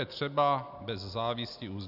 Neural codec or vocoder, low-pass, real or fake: none; 5.4 kHz; real